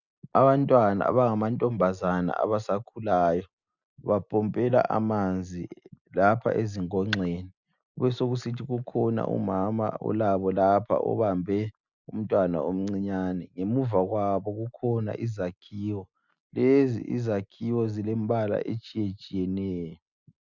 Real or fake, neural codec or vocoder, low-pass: real; none; 7.2 kHz